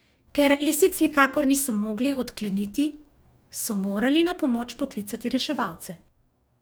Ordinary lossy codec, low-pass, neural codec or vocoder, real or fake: none; none; codec, 44.1 kHz, 2.6 kbps, DAC; fake